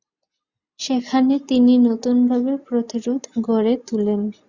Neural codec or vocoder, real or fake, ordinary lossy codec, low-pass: none; real; Opus, 64 kbps; 7.2 kHz